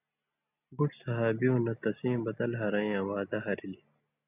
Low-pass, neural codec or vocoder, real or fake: 3.6 kHz; none; real